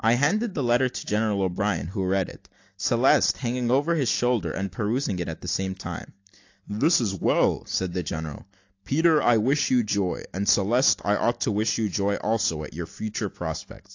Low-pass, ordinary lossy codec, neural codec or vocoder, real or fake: 7.2 kHz; AAC, 48 kbps; none; real